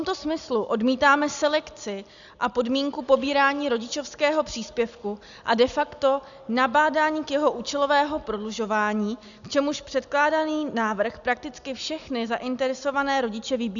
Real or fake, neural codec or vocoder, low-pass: real; none; 7.2 kHz